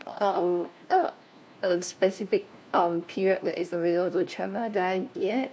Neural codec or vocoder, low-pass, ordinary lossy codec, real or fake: codec, 16 kHz, 1 kbps, FunCodec, trained on LibriTTS, 50 frames a second; none; none; fake